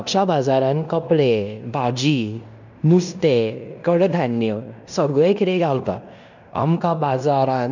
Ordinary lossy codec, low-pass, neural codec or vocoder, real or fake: none; 7.2 kHz; codec, 16 kHz in and 24 kHz out, 0.9 kbps, LongCat-Audio-Codec, fine tuned four codebook decoder; fake